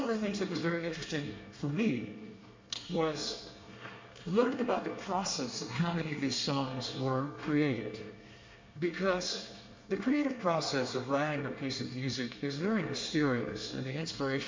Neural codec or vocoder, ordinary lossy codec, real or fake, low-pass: codec, 24 kHz, 1 kbps, SNAC; MP3, 48 kbps; fake; 7.2 kHz